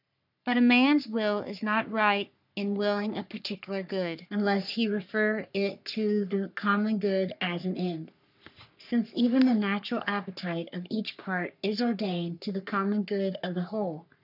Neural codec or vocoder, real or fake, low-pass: codec, 44.1 kHz, 3.4 kbps, Pupu-Codec; fake; 5.4 kHz